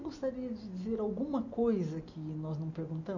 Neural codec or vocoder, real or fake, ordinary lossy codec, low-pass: none; real; none; 7.2 kHz